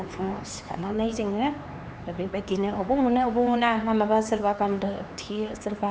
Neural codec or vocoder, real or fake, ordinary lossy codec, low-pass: codec, 16 kHz, 4 kbps, X-Codec, HuBERT features, trained on LibriSpeech; fake; none; none